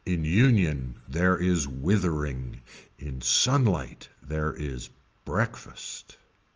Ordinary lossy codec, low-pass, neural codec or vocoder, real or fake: Opus, 24 kbps; 7.2 kHz; none; real